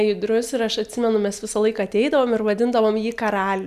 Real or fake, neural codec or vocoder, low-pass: real; none; 14.4 kHz